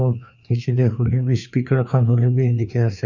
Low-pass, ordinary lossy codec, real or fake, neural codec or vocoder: 7.2 kHz; none; fake; codec, 16 kHz, 2 kbps, FreqCodec, larger model